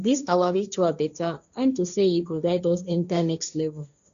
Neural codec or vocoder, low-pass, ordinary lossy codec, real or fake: codec, 16 kHz, 1.1 kbps, Voila-Tokenizer; 7.2 kHz; none; fake